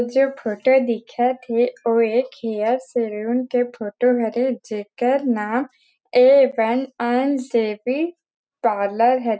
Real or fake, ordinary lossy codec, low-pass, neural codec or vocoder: real; none; none; none